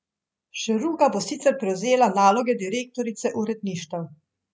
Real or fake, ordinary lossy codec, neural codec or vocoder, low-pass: real; none; none; none